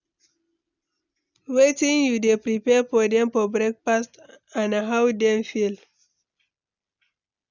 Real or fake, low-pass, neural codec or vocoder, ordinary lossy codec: real; 7.2 kHz; none; none